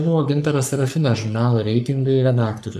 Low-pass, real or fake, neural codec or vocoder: 14.4 kHz; fake; codec, 44.1 kHz, 3.4 kbps, Pupu-Codec